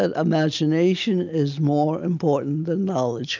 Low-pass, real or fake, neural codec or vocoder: 7.2 kHz; real; none